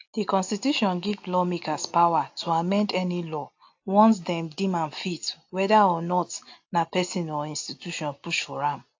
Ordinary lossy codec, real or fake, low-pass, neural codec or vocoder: AAC, 48 kbps; real; 7.2 kHz; none